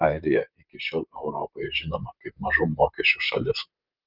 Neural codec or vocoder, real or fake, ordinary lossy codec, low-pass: vocoder, 44.1 kHz, 128 mel bands, Pupu-Vocoder; fake; Opus, 24 kbps; 5.4 kHz